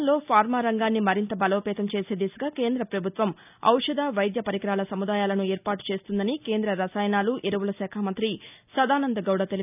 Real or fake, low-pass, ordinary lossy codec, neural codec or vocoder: real; 3.6 kHz; none; none